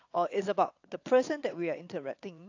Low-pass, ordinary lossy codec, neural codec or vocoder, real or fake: 7.2 kHz; AAC, 48 kbps; vocoder, 22.05 kHz, 80 mel bands, WaveNeXt; fake